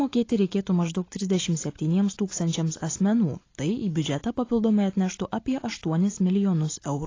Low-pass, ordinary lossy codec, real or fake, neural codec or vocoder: 7.2 kHz; AAC, 32 kbps; real; none